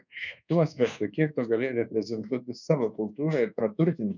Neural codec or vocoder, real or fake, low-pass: codec, 24 kHz, 1.2 kbps, DualCodec; fake; 7.2 kHz